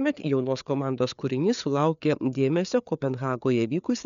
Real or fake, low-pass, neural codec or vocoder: fake; 7.2 kHz; codec, 16 kHz, 4 kbps, FreqCodec, larger model